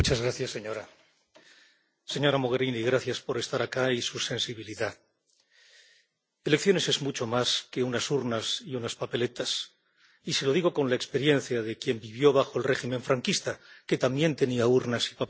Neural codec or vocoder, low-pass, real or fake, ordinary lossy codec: none; none; real; none